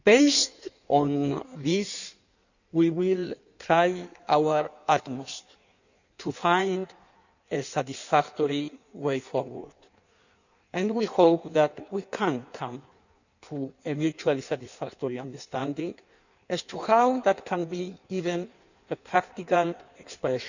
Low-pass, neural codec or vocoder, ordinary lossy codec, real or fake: 7.2 kHz; codec, 16 kHz in and 24 kHz out, 1.1 kbps, FireRedTTS-2 codec; none; fake